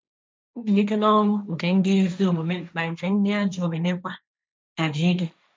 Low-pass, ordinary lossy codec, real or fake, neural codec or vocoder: none; none; fake; codec, 16 kHz, 1.1 kbps, Voila-Tokenizer